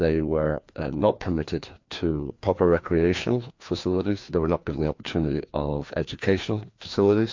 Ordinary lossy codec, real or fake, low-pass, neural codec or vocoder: MP3, 48 kbps; fake; 7.2 kHz; codec, 16 kHz, 2 kbps, FreqCodec, larger model